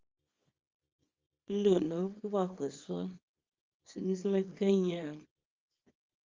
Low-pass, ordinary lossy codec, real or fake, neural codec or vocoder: 7.2 kHz; Opus, 32 kbps; fake; codec, 24 kHz, 0.9 kbps, WavTokenizer, small release